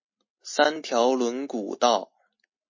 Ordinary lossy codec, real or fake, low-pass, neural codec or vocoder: MP3, 32 kbps; real; 7.2 kHz; none